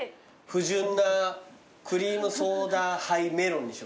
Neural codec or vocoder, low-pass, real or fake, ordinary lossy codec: none; none; real; none